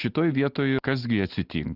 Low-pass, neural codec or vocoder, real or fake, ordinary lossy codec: 5.4 kHz; none; real; Opus, 32 kbps